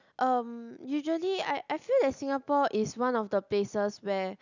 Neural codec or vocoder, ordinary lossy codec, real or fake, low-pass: none; none; real; 7.2 kHz